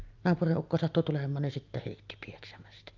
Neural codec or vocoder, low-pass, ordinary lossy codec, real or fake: none; 7.2 kHz; Opus, 24 kbps; real